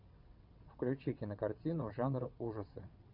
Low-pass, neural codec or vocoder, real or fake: 5.4 kHz; vocoder, 22.05 kHz, 80 mel bands, WaveNeXt; fake